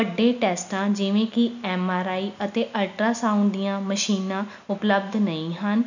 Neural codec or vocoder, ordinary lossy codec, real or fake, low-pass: none; none; real; 7.2 kHz